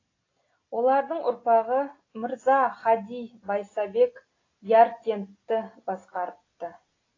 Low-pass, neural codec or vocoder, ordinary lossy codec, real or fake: 7.2 kHz; none; AAC, 32 kbps; real